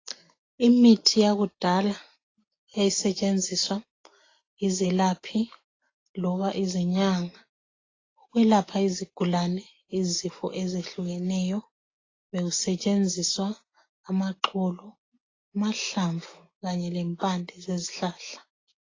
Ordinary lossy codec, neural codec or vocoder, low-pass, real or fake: AAC, 32 kbps; none; 7.2 kHz; real